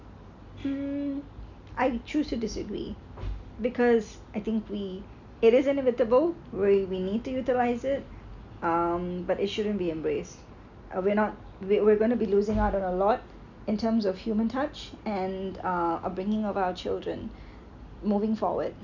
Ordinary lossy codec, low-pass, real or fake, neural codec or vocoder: none; 7.2 kHz; real; none